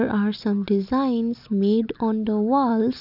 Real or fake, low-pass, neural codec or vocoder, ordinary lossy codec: real; 5.4 kHz; none; none